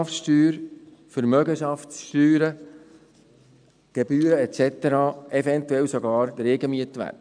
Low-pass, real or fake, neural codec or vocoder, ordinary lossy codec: 9.9 kHz; real; none; none